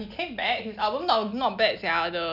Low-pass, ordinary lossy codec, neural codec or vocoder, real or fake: 5.4 kHz; none; none; real